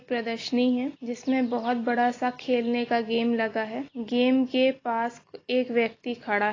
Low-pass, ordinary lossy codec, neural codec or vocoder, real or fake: 7.2 kHz; AAC, 32 kbps; none; real